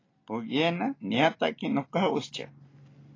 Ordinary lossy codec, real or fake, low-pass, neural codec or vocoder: AAC, 32 kbps; real; 7.2 kHz; none